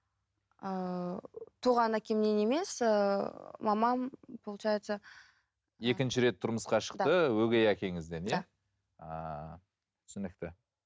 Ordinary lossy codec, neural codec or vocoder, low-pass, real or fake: none; none; none; real